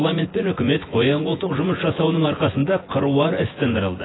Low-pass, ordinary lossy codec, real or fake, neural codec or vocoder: 7.2 kHz; AAC, 16 kbps; fake; vocoder, 24 kHz, 100 mel bands, Vocos